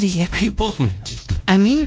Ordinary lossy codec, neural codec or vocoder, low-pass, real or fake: none; codec, 16 kHz, 1 kbps, X-Codec, WavLM features, trained on Multilingual LibriSpeech; none; fake